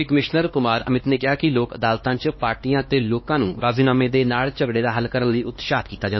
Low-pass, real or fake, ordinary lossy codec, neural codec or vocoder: 7.2 kHz; fake; MP3, 24 kbps; codec, 16 kHz, 2 kbps, X-Codec, HuBERT features, trained on LibriSpeech